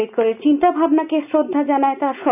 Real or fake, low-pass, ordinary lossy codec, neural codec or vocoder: real; 3.6 kHz; none; none